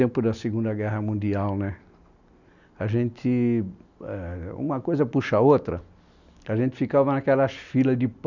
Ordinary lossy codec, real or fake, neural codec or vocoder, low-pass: none; real; none; 7.2 kHz